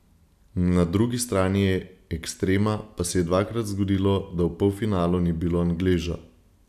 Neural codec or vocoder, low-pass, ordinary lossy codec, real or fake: none; 14.4 kHz; none; real